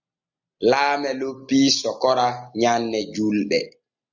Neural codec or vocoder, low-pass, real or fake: none; 7.2 kHz; real